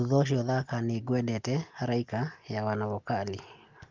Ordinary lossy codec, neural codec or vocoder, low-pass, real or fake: Opus, 32 kbps; none; 7.2 kHz; real